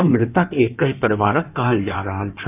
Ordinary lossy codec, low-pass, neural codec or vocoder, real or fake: none; 3.6 kHz; codec, 16 kHz in and 24 kHz out, 1.1 kbps, FireRedTTS-2 codec; fake